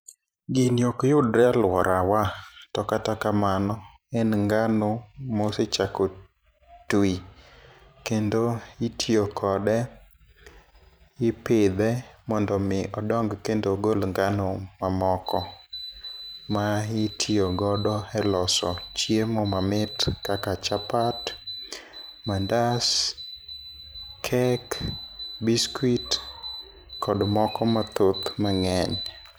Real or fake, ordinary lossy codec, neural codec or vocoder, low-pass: fake; none; vocoder, 44.1 kHz, 128 mel bands every 512 samples, BigVGAN v2; none